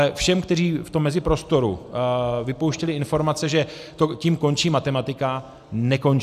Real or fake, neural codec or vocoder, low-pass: real; none; 14.4 kHz